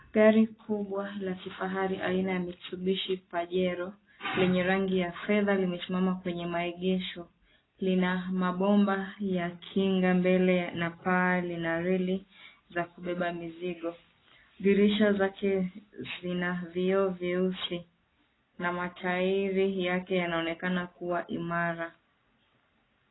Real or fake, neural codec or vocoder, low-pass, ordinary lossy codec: real; none; 7.2 kHz; AAC, 16 kbps